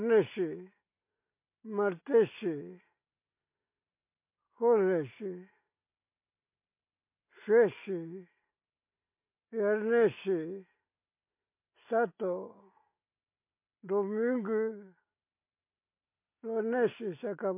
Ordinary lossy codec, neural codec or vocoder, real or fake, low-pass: MP3, 24 kbps; none; real; 3.6 kHz